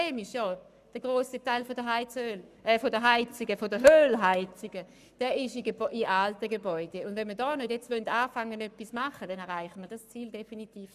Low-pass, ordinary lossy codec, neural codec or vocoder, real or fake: 14.4 kHz; none; codec, 44.1 kHz, 7.8 kbps, Pupu-Codec; fake